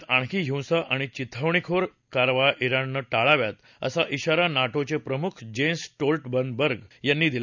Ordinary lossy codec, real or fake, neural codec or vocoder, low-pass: none; real; none; 7.2 kHz